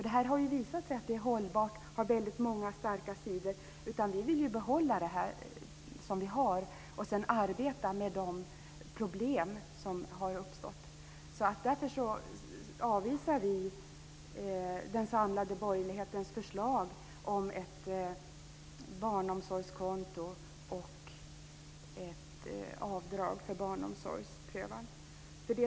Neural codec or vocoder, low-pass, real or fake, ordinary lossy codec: none; none; real; none